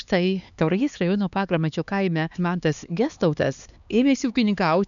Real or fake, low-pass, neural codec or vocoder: fake; 7.2 kHz; codec, 16 kHz, 2 kbps, X-Codec, HuBERT features, trained on LibriSpeech